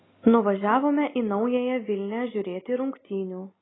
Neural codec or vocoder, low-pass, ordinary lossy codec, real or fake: none; 7.2 kHz; AAC, 16 kbps; real